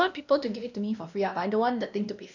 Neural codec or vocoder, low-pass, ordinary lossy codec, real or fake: codec, 16 kHz, 1 kbps, X-Codec, WavLM features, trained on Multilingual LibriSpeech; 7.2 kHz; none; fake